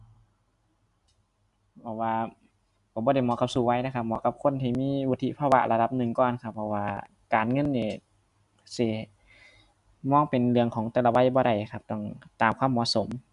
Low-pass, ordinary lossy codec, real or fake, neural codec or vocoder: 10.8 kHz; Opus, 64 kbps; real; none